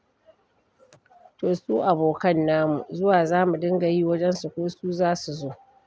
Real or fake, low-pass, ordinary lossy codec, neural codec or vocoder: real; none; none; none